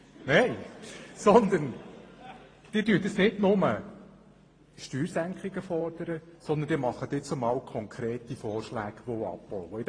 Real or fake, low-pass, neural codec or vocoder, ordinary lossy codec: fake; 9.9 kHz; vocoder, 44.1 kHz, 128 mel bands every 512 samples, BigVGAN v2; AAC, 32 kbps